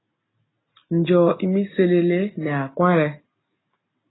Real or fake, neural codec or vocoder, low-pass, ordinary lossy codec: real; none; 7.2 kHz; AAC, 16 kbps